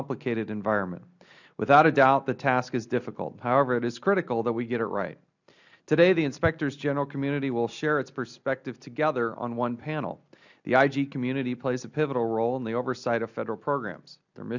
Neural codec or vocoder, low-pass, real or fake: none; 7.2 kHz; real